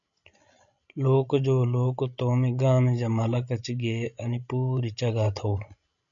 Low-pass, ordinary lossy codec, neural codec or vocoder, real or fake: 7.2 kHz; MP3, 96 kbps; codec, 16 kHz, 16 kbps, FreqCodec, larger model; fake